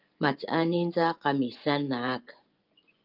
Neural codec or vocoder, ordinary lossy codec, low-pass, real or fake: vocoder, 24 kHz, 100 mel bands, Vocos; Opus, 32 kbps; 5.4 kHz; fake